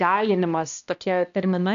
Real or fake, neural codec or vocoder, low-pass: fake; codec, 16 kHz, 1 kbps, X-Codec, HuBERT features, trained on balanced general audio; 7.2 kHz